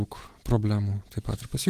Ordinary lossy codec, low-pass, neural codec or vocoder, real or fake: Opus, 16 kbps; 14.4 kHz; none; real